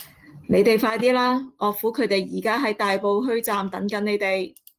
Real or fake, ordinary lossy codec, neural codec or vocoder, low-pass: real; Opus, 24 kbps; none; 14.4 kHz